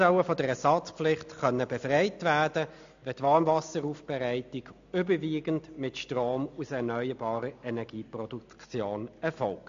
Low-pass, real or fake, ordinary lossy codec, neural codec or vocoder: 7.2 kHz; real; AAC, 64 kbps; none